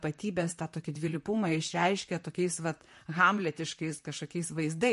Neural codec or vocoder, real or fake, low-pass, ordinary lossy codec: vocoder, 44.1 kHz, 128 mel bands, Pupu-Vocoder; fake; 14.4 kHz; MP3, 48 kbps